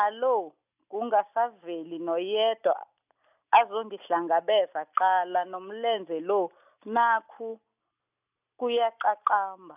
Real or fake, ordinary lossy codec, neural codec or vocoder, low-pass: real; none; none; 3.6 kHz